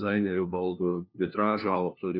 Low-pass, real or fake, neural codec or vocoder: 5.4 kHz; fake; codec, 16 kHz, 1 kbps, FunCodec, trained on LibriTTS, 50 frames a second